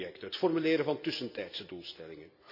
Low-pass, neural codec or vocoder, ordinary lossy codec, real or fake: 5.4 kHz; none; none; real